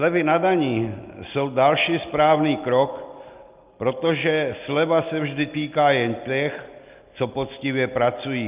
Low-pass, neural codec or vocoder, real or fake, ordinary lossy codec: 3.6 kHz; none; real; Opus, 24 kbps